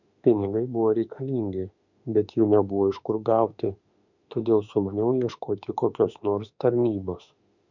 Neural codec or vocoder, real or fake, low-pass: autoencoder, 48 kHz, 32 numbers a frame, DAC-VAE, trained on Japanese speech; fake; 7.2 kHz